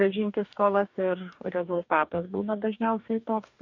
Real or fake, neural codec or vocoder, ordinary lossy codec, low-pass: fake; codec, 44.1 kHz, 2.6 kbps, DAC; MP3, 64 kbps; 7.2 kHz